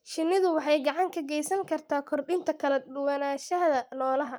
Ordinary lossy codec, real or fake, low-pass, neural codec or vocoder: none; fake; none; codec, 44.1 kHz, 7.8 kbps, Pupu-Codec